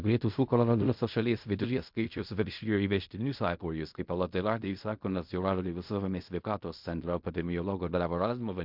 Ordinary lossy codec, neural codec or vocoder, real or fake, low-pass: MP3, 48 kbps; codec, 16 kHz in and 24 kHz out, 0.4 kbps, LongCat-Audio-Codec, fine tuned four codebook decoder; fake; 5.4 kHz